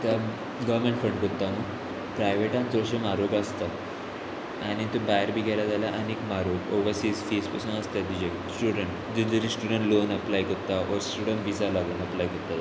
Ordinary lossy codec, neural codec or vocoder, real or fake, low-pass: none; none; real; none